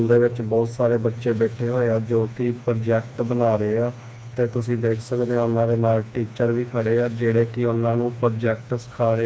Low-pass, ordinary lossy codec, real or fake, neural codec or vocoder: none; none; fake; codec, 16 kHz, 2 kbps, FreqCodec, smaller model